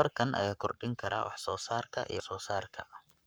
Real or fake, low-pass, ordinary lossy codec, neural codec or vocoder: fake; none; none; codec, 44.1 kHz, 7.8 kbps, Pupu-Codec